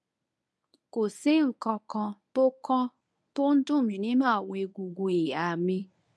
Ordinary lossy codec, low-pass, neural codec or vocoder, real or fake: none; none; codec, 24 kHz, 0.9 kbps, WavTokenizer, medium speech release version 1; fake